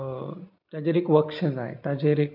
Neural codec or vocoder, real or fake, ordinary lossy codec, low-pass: none; real; none; 5.4 kHz